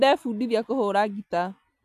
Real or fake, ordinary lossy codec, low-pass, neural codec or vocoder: real; AAC, 96 kbps; 14.4 kHz; none